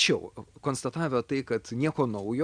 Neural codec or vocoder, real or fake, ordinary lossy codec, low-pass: none; real; Opus, 64 kbps; 9.9 kHz